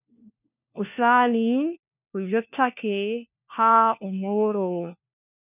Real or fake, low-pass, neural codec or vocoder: fake; 3.6 kHz; codec, 16 kHz, 1 kbps, FunCodec, trained on LibriTTS, 50 frames a second